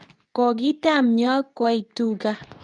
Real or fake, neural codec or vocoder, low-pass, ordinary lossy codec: fake; codec, 24 kHz, 0.9 kbps, WavTokenizer, medium speech release version 2; 10.8 kHz; none